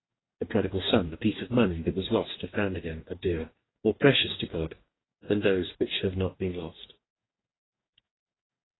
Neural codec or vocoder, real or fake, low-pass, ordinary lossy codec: codec, 44.1 kHz, 2.6 kbps, DAC; fake; 7.2 kHz; AAC, 16 kbps